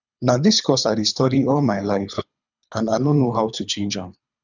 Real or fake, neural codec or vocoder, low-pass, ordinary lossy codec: fake; codec, 24 kHz, 3 kbps, HILCodec; 7.2 kHz; none